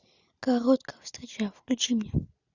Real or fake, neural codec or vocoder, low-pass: real; none; 7.2 kHz